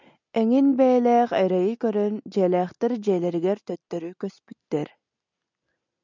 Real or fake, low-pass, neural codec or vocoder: real; 7.2 kHz; none